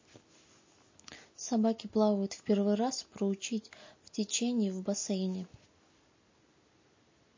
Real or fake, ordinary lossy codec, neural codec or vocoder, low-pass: real; MP3, 32 kbps; none; 7.2 kHz